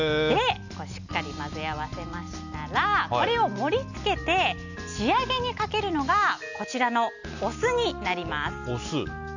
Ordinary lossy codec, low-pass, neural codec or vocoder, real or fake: none; 7.2 kHz; none; real